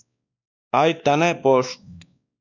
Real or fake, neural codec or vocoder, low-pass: fake; codec, 16 kHz, 2 kbps, X-Codec, WavLM features, trained on Multilingual LibriSpeech; 7.2 kHz